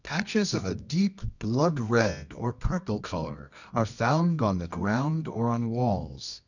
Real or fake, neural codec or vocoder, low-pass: fake; codec, 24 kHz, 0.9 kbps, WavTokenizer, medium music audio release; 7.2 kHz